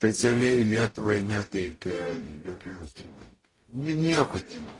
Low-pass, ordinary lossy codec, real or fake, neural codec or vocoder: 10.8 kHz; AAC, 32 kbps; fake; codec, 44.1 kHz, 0.9 kbps, DAC